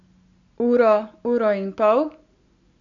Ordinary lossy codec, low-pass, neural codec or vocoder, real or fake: none; 7.2 kHz; none; real